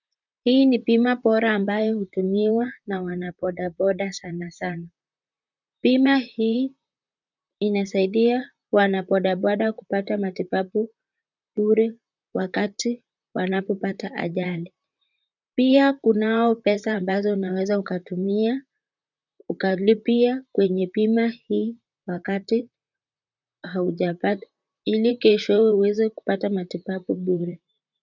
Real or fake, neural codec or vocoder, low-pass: fake; vocoder, 44.1 kHz, 128 mel bands, Pupu-Vocoder; 7.2 kHz